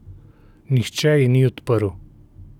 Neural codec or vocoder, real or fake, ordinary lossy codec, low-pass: none; real; none; 19.8 kHz